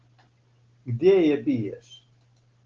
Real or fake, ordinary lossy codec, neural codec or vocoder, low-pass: real; Opus, 16 kbps; none; 7.2 kHz